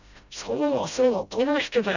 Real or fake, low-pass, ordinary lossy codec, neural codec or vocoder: fake; 7.2 kHz; none; codec, 16 kHz, 0.5 kbps, FreqCodec, smaller model